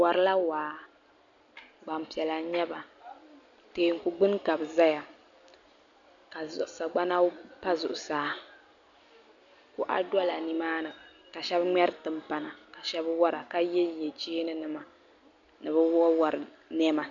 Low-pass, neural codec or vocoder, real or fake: 7.2 kHz; none; real